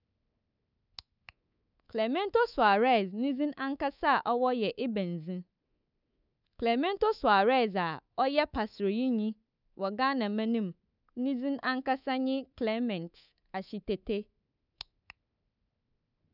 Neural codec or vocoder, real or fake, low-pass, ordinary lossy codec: codec, 24 kHz, 3.1 kbps, DualCodec; fake; 5.4 kHz; none